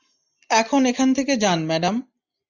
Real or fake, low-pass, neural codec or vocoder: real; 7.2 kHz; none